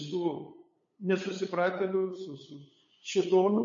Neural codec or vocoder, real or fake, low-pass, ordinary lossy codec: codec, 16 kHz, 8 kbps, FunCodec, trained on LibriTTS, 25 frames a second; fake; 7.2 kHz; MP3, 32 kbps